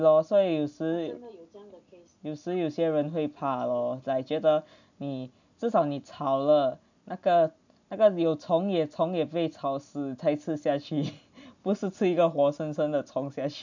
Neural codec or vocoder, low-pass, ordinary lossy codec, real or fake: none; 7.2 kHz; none; real